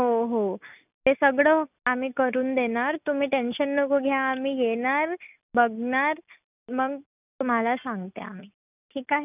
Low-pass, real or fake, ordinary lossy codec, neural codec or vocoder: 3.6 kHz; real; none; none